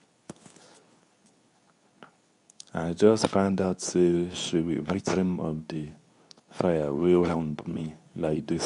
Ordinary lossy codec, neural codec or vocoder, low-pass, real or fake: none; codec, 24 kHz, 0.9 kbps, WavTokenizer, medium speech release version 2; 10.8 kHz; fake